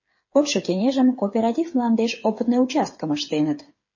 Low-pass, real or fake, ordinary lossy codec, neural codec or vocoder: 7.2 kHz; fake; MP3, 32 kbps; codec, 16 kHz, 8 kbps, FreqCodec, smaller model